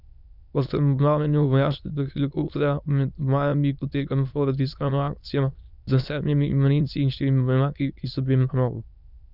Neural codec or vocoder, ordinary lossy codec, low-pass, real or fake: autoencoder, 22.05 kHz, a latent of 192 numbers a frame, VITS, trained on many speakers; none; 5.4 kHz; fake